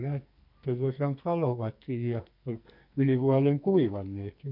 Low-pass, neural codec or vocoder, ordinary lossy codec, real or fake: 5.4 kHz; codec, 44.1 kHz, 2.6 kbps, SNAC; none; fake